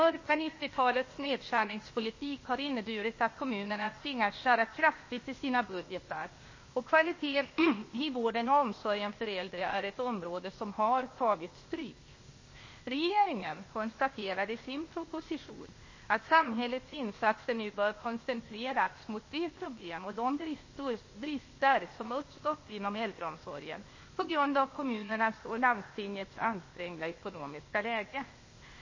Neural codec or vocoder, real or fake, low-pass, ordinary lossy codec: codec, 16 kHz, 0.8 kbps, ZipCodec; fake; 7.2 kHz; MP3, 32 kbps